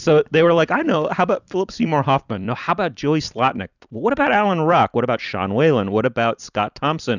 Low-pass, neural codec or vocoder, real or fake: 7.2 kHz; vocoder, 44.1 kHz, 128 mel bands every 256 samples, BigVGAN v2; fake